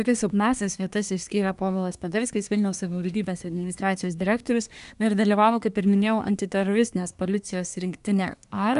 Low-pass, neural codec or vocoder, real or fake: 10.8 kHz; codec, 24 kHz, 1 kbps, SNAC; fake